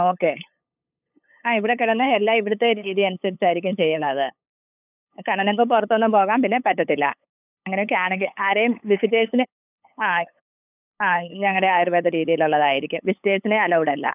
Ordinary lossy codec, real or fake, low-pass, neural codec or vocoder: none; fake; 3.6 kHz; codec, 16 kHz, 8 kbps, FunCodec, trained on LibriTTS, 25 frames a second